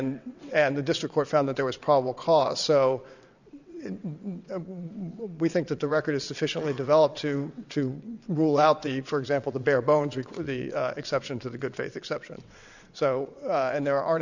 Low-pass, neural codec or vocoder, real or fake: 7.2 kHz; vocoder, 22.05 kHz, 80 mel bands, WaveNeXt; fake